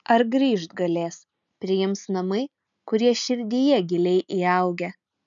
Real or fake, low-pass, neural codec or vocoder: real; 7.2 kHz; none